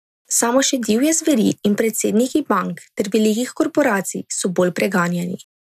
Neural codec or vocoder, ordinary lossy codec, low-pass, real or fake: none; none; 14.4 kHz; real